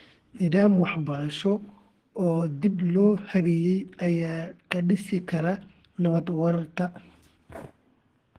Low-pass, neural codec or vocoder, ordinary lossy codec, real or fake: 14.4 kHz; codec, 32 kHz, 1.9 kbps, SNAC; Opus, 16 kbps; fake